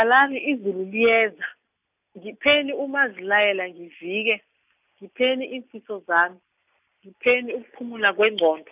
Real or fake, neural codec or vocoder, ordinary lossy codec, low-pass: real; none; none; 3.6 kHz